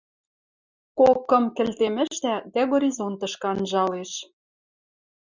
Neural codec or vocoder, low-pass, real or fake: none; 7.2 kHz; real